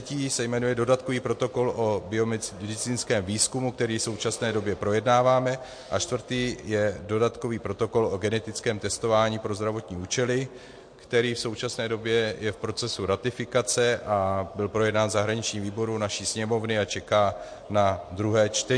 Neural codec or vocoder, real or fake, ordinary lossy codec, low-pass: none; real; MP3, 48 kbps; 9.9 kHz